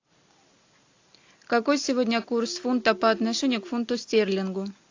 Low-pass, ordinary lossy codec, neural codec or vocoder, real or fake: 7.2 kHz; AAC, 48 kbps; none; real